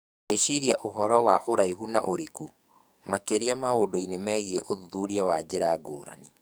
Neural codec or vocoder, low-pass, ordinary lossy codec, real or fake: codec, 44.1 kHz, 2.6 kbps, SNAC; none; none; fake